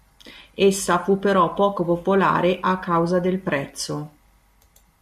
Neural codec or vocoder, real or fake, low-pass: none; real; 14.4 kHz